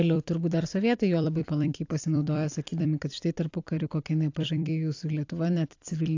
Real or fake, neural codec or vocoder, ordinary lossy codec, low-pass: fake; vocoder, 44.1 kHz, 128 mel bands every 256 samples, BigVGAN v2; AAC, 48 kbps; 7.2 kHz